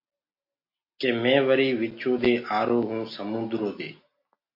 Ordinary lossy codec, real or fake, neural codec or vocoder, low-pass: MP3, 32 kbps; real; none; 5.4 kHz